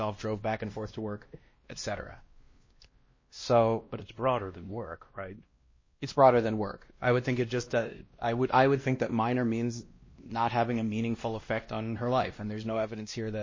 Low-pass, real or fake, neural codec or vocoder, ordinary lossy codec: 7.2 kHz; fake; codec, 16 kHz, 1 kbps, X-Codec, WavLM features, trained on Multilingual LibriSpeech; MP3, 32 kbps